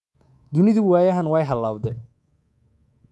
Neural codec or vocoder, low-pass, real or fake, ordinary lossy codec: codec, 24 kHz, 3.1 kbps, DualCodec; none; fake; none